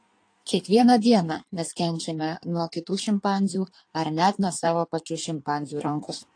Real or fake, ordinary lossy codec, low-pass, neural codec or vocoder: fake; AAC, 48 kbps; 9.9 kHz; codec, 16 kHz in and 24 kHz out, 1.1 kbps, FireRedTTS-2 codec